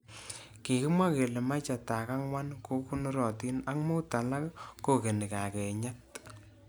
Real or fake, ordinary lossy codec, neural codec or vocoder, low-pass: real; none; none; none